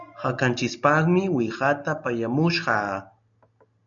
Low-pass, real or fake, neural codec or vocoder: 7.2 kHz; real; none